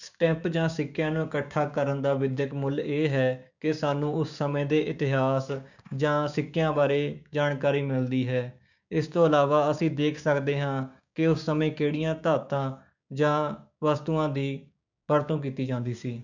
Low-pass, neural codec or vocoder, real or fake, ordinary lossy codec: 7.2 kHz; codec, 16 kHz, 6 kbps, DAC; fake; none